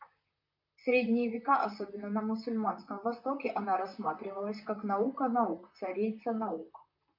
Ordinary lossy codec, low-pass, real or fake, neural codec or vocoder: MP3, 48 kbps; 5.4 kHz; fake; vocoder, 44.1 kHz, 128 mel bands, Pupu-Vocoder